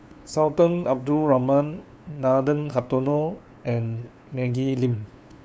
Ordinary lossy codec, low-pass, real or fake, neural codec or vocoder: none; none; fake; codec, 16 kHz, 2 kbps, FunCodec, trained on LibriTTS, 25 frames a second